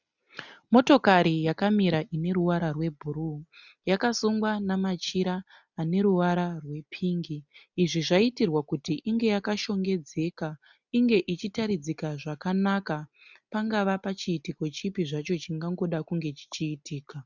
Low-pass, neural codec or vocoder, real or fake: 7.2 kHz; none; real